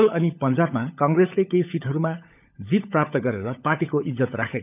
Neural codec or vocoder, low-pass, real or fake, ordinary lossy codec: codec, 16 kHz, 16 kbps, FunCodec, trained on LibriTTS, 50 frames a second; 3.6 kHz; fake; none